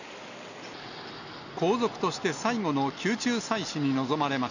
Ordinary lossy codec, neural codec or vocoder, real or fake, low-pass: none; none; real; 7.2 kHz